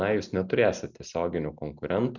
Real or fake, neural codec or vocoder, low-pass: real; none; 7.2 kHz